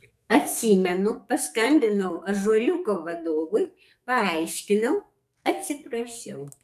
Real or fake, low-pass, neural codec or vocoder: fake; 14.4 kHz; codec, 44.1 kHz, 2.6 kbps, SNAC